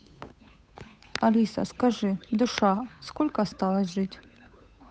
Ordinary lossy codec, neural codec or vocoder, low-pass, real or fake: none; codec, 16 kHz, 8 kbps, FunCodec, trained on Chinese and English, 25 frames a second; none; fake